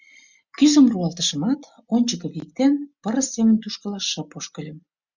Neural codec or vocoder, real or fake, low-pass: none; real; 7.2 kHz